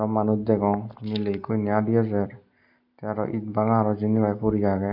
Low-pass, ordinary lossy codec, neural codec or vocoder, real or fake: 5.4 kHz; none; none; real